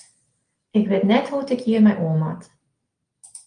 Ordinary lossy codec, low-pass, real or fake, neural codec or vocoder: Opus, 32 kbps; 9.9 kHz; real; none